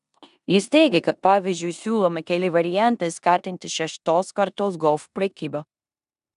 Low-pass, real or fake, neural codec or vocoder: 10.8 kHz; fake; codec, 16 kHz in and 24 kHz out, 0.9 kbps, LongCat-Audio-Codec, four codebook decoder